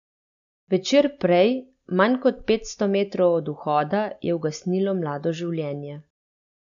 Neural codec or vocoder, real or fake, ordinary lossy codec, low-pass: none; real; none; 7.2 kHz